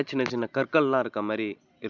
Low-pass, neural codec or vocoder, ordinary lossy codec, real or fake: 7.2 kHz; none; none; real